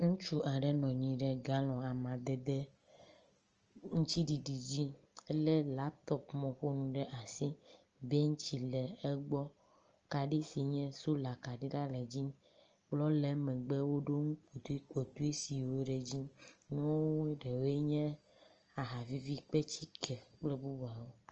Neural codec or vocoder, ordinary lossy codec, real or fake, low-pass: none; Opus, 24 kbps; real; 7.2 kHz